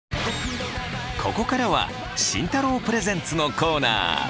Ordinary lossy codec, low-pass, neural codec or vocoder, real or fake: none; none; none; real